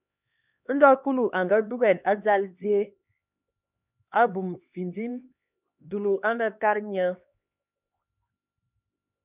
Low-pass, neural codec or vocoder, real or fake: 3.6 kHz; codec, 16 kHz, 2 kbps, X-Codec, HuBERT features, trained on LibriSpeech; fake